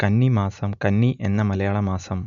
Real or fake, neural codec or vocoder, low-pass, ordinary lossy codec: real; none; 7.2 kHz; MP3, 64 kbps